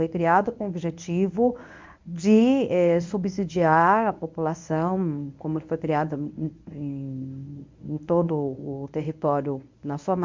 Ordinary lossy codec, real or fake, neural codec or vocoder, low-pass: MP3, 64 kbps; fake; codec, 24 kHz, 0.9 kbps, WavTokenizer, medium speech release version 1; 7.2 kHz